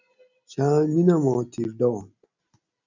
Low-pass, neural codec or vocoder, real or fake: 7.2 kHz; none; real